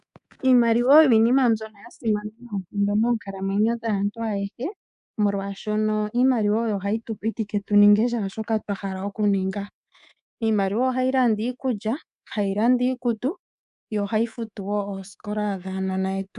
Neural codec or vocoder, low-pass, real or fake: codec, 24 kHz, 3.1 kbps, DualCodec; 10.8 kHz; fake